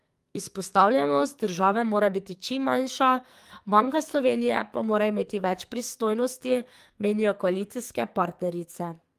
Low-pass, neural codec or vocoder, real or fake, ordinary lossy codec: 14.4 kHz; codec, 44.1 kHz, 2.6 kbps, SNAC; fake; Opus, 32 kbps